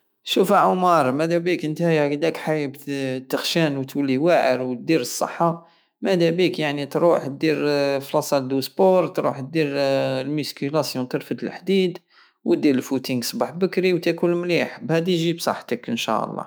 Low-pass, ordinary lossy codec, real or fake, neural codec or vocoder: none; none; fake; autoencoder, 48 kHz, 128 numbers a frame, DAC-VAE, trained on Japanese speech